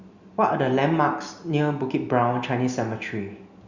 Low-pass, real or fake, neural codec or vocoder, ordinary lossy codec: 7.2 kHz; real; none; Opus, 64 kbps